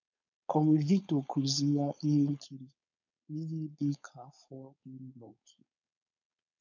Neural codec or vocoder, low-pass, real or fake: codec, 16 kHz, 4.8 kbps, FACodec; 7.2 kHz; fake